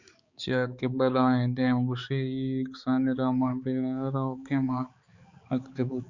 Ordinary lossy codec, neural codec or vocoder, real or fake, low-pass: Opus, 64 kbps; codec, 16 kHz, 4 kbps, X-Codec, HuBERT features, trained on balanced general audio; fake; 7.2 kHz